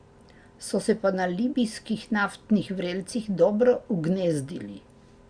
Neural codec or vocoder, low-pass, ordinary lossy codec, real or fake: none; 9.9 kHz; Opus, 64 kbps; real